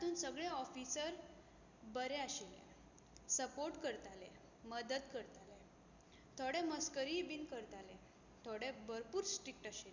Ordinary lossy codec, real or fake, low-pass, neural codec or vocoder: none; real; 7.2 kHz; none